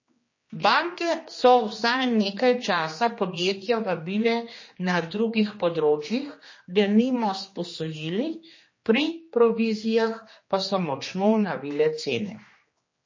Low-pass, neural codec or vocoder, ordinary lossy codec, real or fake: 7.2 kHz; codec, 16 kHz, 2 kbps, X-Codec, HuBERT features, trained on general audio; MP3, 32 kbps; fake